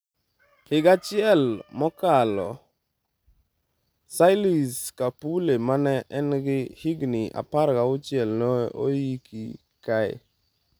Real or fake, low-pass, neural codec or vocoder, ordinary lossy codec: real; none; none; none